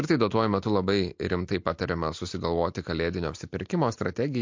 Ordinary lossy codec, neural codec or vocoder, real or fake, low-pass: MP3, 48 kbps; none; real; 7.2 kHz